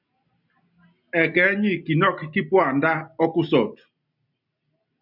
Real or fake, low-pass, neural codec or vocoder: real; 5.4 kHz; none